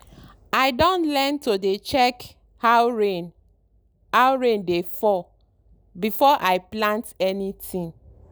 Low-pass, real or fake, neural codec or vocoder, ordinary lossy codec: none; real; none; none